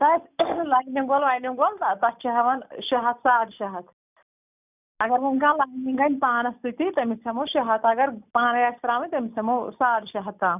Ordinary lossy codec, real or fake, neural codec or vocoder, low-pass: none; real; none; 3.6 kHz